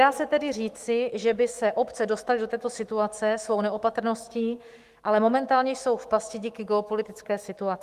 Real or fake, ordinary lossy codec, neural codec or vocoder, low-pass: fake; Opus, 24 kbps; autoencoder, 48 kHz, 128 numbers a frame, DAC-VAE, trained on Japanese speech; 14.4 kHz